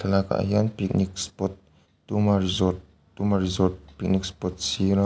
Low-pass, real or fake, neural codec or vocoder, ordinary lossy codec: none; real; none; none